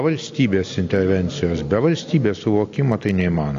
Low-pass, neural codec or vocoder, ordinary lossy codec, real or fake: 7.2 kHz; none; AAC, 48 kbps; real